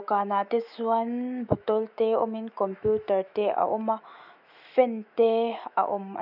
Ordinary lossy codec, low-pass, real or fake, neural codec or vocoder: none; 5.4 kHz; fake; autoencoder, 48 kHz, 128 numbers a frame, DAC-VAE, trained on Japanese speech